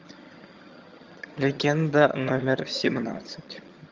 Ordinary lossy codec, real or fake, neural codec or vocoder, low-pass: Opus, 32 kbps; fake; vocoder, 22.05 kHz, 80 mel bands, HiFi-GAN; 7.2 kHz